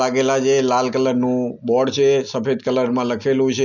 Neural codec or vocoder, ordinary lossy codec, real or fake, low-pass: none; none; real; 7.2 kHz